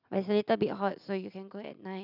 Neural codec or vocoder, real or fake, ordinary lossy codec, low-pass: none; real; none; 5.4 kHz